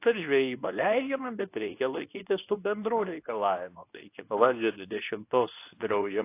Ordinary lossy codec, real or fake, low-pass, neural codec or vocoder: AAC, 24 kbps; fake; 3.6 kHz; codec, 24 kHz, 0.9 kbps, WavTokenizer, medium speech release version 2